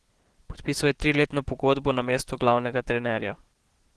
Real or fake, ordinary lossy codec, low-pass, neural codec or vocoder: fake; Opus, 16 kbps; 10.8 kHz; codec, 44.1 kHz, 7.8 kbps, DAC